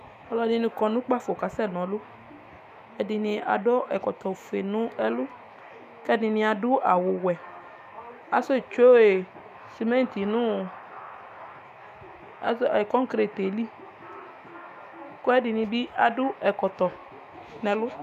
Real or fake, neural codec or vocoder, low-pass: fake; autoencoder, 48 kHz, 128 numbers a frame, DAC-VAE, trained on Japanese speech; 14.4 kHz